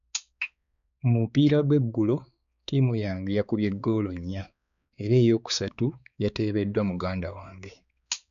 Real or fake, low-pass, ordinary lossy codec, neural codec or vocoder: fake; 7.2 kHz; none; codec, 16 kHz, 4 kbps, X-Codec, HuBERT features, trained on balanced general audio